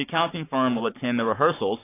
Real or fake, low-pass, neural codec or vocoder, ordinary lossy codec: fake; 3.6 kHz; codec, 44.1 kHz, 7.8 kbps, Pupu-Codec; AAC, 24 kbps